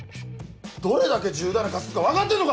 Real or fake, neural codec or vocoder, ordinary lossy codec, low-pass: real; none; none; none